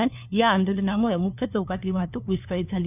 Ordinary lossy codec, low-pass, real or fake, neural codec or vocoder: none; 3.6 kHz; fake; codec, 16 kHz, 2 kbps, FunCodec, trained on LibriTTS, 25 frames a second